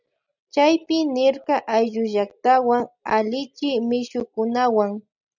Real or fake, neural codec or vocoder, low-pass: real; none; 7.2 kHz